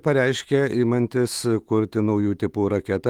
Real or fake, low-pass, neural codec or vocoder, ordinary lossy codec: fake; 19.8 kHz; autoencoder, 48 kHz, 128 numbers a frame, DAC-VAE, trained on Japanese speech; Opus, 24 kbps